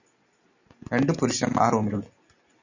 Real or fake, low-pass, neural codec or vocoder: real; 7.2 kHz; none